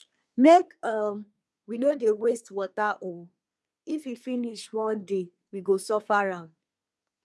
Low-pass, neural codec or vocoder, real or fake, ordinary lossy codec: none; codec, 24 kHz, 1 kbps, SNAC; fake; none